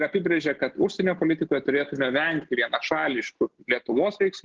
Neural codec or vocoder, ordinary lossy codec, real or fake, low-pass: none; Opus, 16 kbps; real; 7.2 kHz